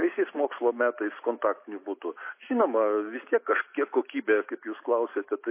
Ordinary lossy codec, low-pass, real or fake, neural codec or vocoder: MP3, 24 kbps; 3.6 kHz; real; none